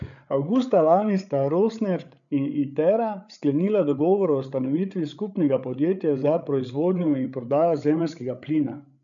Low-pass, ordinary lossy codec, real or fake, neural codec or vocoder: 7.2 kHz; none; fake; codec, 16 kHz, 8 kbps, FreqCodec, larger model